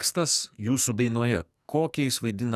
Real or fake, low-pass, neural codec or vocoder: fake; 14.4 kHz; codec, 44.1 kHz, 2.6 kbps, SNAC